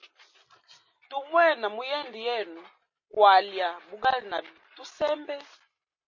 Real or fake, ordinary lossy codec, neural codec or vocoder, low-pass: real; MP3, 32 kbps; none; 7.2 kHz